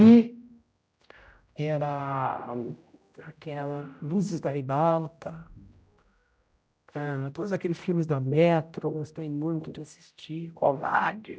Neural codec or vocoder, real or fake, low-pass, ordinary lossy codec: codec, 16 kHz, 0.5 kbps, X-Codec, HuBERT features, trained on general audio; fake; none; none